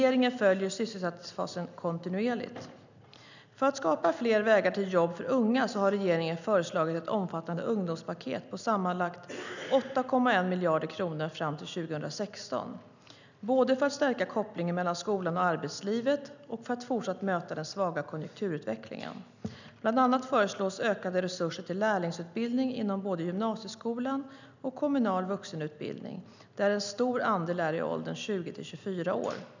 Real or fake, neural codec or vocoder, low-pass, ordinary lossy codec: real; none; 7.2 kHz; none